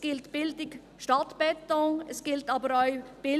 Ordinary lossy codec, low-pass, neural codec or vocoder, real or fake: none; 14.4 kHz; none; real